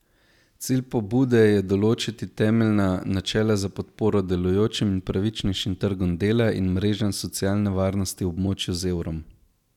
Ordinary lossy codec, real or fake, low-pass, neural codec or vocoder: none; real; 19.8 kHz; none